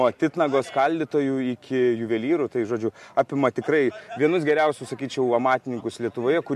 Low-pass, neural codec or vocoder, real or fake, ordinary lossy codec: 14.4 kHz; none; real; MP3, 64 kbps